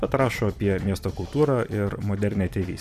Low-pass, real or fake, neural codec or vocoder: 14.4 kHz; fake; vocoder, 44.1 kHz, 128 mel bands every 256 samples, BigVGAN v2